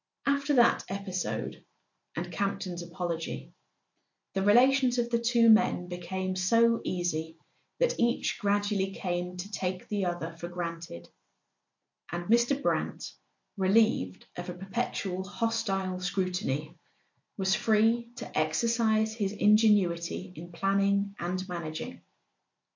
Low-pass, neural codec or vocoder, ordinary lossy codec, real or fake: 7.2 kHz; none; MP3, 48 kbps; real